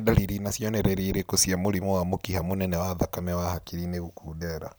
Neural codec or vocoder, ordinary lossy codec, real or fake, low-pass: none; none; real; none